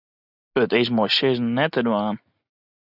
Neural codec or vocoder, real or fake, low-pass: none; real; 5.4 kHz